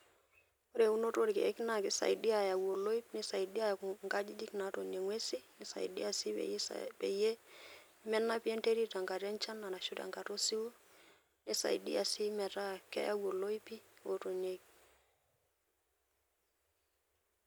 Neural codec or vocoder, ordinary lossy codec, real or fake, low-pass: none; none; real; none